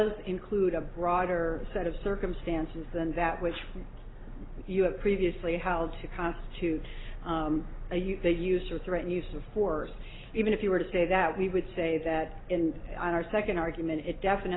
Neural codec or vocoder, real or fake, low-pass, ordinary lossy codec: none; real; 7.2 kHz; AAC, 16 kbps